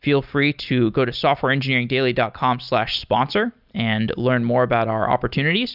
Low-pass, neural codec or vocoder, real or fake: 5.4 kHz; none; real